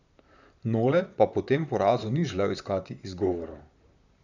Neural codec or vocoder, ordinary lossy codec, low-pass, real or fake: vocoder, 44.1 kHz, 128 mel bands, Pupu-Vocoder; none; 7.2 kHz; fake